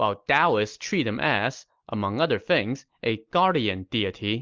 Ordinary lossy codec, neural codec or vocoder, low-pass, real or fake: Opus, 32 kbps; none; 7.2 kHz; real